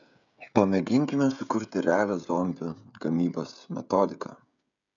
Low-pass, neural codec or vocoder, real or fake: 7.2 kHz; codec, 16 kHz, 16 kbps, FreqCodec, smaller model; fake